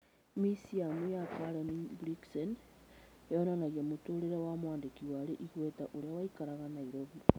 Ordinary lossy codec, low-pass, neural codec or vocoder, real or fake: none; none; none; real